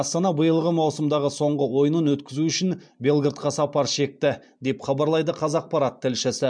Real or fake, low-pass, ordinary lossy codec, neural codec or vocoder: real; 9.9 kHz; MP3, 64 kbps; none